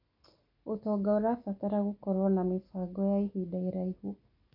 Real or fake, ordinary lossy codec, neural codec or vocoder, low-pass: real; none; none; 5.4 kHz